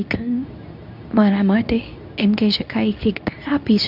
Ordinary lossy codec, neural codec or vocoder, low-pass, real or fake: none; codec, 24 kHz, 0.9 kbps, WavTokenizer, medium speech release version 2; 5.4 kHz; fake